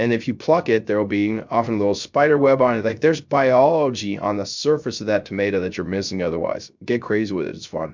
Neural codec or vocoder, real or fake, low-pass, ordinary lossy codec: codec, 16 kHz, 0.3 kbps, FocalCodec; fake; 7.2 kHz; MP3, 64 kbps